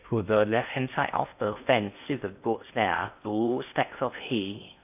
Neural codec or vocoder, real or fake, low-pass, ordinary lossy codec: codec, 16 kHz in and 24 kHz out, 0.8 kbps, FocalCodec, streaming, 65536 codes; fake; 3.6 kHz; AAC, 32 kbps